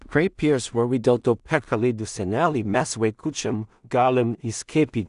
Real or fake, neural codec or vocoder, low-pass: fake; codec, 16 kHz in and 24 kHz out, 0.4 kbps, LongCat-Audio-Codec, two codebook decoder; 10.8 kHz